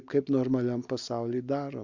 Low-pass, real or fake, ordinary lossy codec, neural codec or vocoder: 7.2 kHz; real; Opus, 64 kbps; none